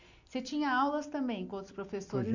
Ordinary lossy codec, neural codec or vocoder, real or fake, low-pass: none; none; real; 7.2 kHz